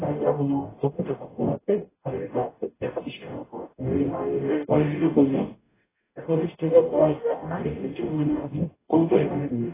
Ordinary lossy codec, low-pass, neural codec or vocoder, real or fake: AAC, 16 kbps; 3.6 kHz; codec, 44.1 kHz, 0.9 kbps, DAC; fake